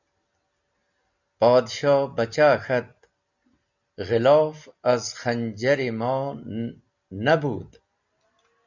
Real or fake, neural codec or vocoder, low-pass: real; none; 7.2 kHz